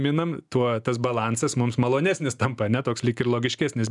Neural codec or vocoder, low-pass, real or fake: none; 10.8 kHz; real